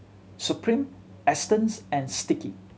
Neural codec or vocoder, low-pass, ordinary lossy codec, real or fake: none; none; none; real